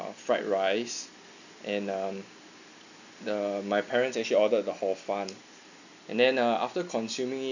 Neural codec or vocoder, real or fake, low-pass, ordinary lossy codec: none; real; 7.2 kHz; none